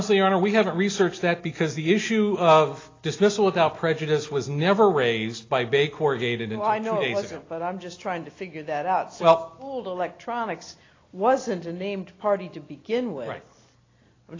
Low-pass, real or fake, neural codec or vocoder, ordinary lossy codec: 7.2 kHz; real; none; AAC, 32 kbps